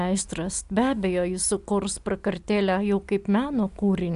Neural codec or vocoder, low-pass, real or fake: none; 10.8 kHz; real